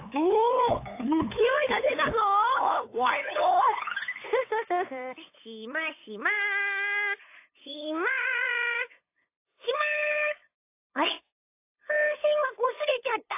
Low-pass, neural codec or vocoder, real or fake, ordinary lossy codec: 3.6 kHz; codec, 16 kHz, 8 kbps, FunCodec, trained on LibriTTS, 25 frames a second; fake; AAC, 24 kbps